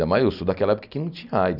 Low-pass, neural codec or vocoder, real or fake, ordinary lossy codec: 5.4 kHz; none; real; none